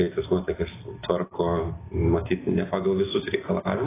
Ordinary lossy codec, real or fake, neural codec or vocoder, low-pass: AAC, 16 kbps; real; none; 3.6 kHz